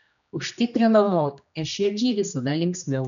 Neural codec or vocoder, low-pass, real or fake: codec, 16 kHz, 1 kbps, X-Codec, HuBERT features, trained on general audio; 7.2 kHz; fake